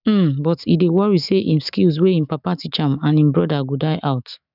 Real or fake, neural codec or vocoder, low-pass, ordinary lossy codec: fake; autoencoder, 48 kHz, 128 numbers a frame, DAC-VAE, trained on Japanese speech; 5.4 kHz; none